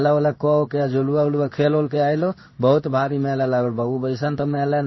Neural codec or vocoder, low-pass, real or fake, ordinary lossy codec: codec, 16 kHz in and 24 kHz out, 1 kbps, XY-Tokenizer; 7.2 kHz; fake; MP3, 24 kbps